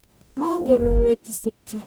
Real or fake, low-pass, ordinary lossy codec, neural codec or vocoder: fake; none; none; codec, 44.1 kHz, 0.9 kbps, DAC